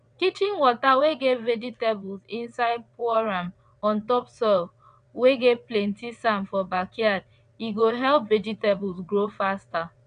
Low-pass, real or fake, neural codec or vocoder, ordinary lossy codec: 9.9 kHz; fake; vocoder, 22.05 kHz, 80 mel bands, WaveNeXt; none